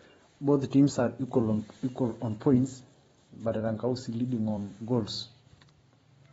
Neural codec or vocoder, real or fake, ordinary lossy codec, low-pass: vocoder, 44.1 kHz, 128 mel bands every 512 samples, BigVGAN v2; fake; AAC, 24 kbps; 19.8 kHz